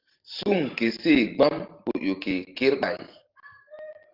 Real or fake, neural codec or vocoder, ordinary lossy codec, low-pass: fake; vocoder, 44.1 kHz, 128 mel bands every 512 samples, BigVGAN v2; Opus, 32 kbps; 5.4 kHz